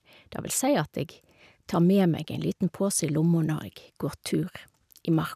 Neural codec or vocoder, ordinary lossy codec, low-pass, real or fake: none; none; 14.4 kHz; real